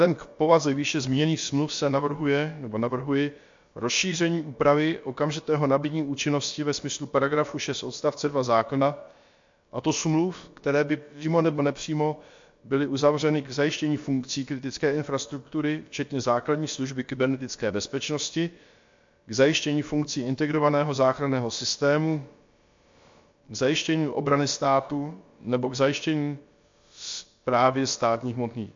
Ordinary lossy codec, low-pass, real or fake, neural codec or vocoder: MP3, 48 kbps; 7.2 kHz; fake; codec, 16 kHz, about 1 kbps, DyCAST, with the encoder's durations